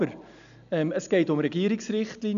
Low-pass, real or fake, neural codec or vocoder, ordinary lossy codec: 7.2 kHz; real; none; none